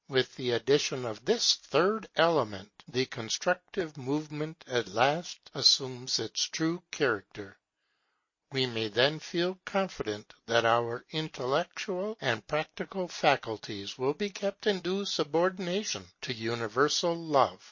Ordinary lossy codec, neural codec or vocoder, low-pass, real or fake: MP3, 32 kbps; none; 7.2 kHz; real